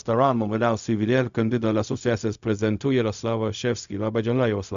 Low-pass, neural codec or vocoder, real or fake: 7.2 kHz; codec, 16 kHz, 0.4 kbps, LongCat-Audio-Codec; fake